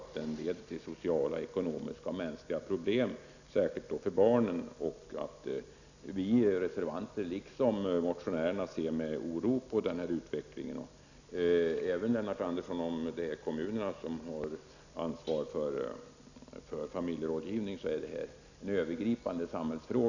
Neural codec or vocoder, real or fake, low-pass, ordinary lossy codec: none; real; 7.2 kHz; none